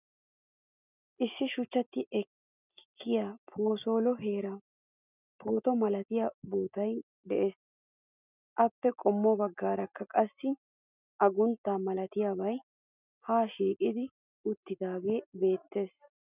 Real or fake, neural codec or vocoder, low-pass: real; none; 3.6 kHz